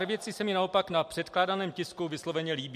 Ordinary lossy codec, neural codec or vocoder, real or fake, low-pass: MP3, 64 kbps; none; real; 14.4 kHz